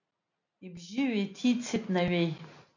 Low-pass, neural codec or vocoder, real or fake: 7.2 kHz; none; real